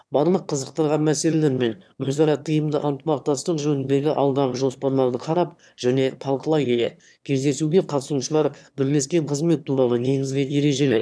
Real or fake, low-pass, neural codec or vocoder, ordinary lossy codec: fake; none; autoencoder, 22.05 kHz, a latent of 192 numbers a frame, VITS, trained on one speaker; none